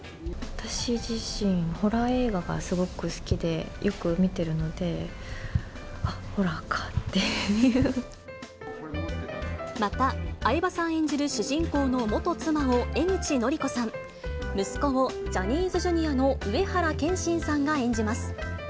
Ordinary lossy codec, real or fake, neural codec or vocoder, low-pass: none; real; none; none